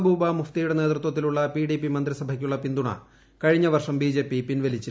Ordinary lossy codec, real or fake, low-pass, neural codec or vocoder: none; real; none; none